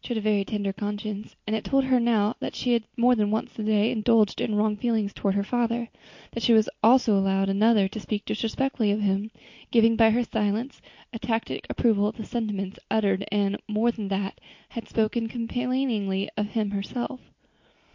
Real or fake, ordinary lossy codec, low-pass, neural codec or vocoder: real; MP3, 48 kbps; 7.2 kHz; none